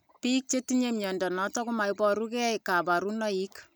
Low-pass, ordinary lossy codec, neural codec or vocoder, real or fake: none; none; none; real